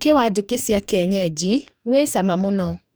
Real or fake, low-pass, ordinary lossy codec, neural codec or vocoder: fake; none; none; codec, 44.1 kHz, 2.6 kbps, DAC